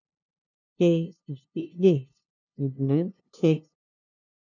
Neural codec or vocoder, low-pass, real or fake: codec, 16 kHz, 0.5 kbps, FunCodec, trained on LibriTTS, 25 frames a second; 7.2 kHz; fake